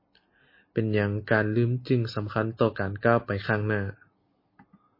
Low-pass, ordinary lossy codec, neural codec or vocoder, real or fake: 5.4 kHz; MP3, 24 kbps; none; real